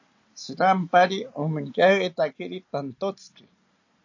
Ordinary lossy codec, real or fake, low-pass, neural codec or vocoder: AAC, 48 kbps; real; 7.2 kHz; none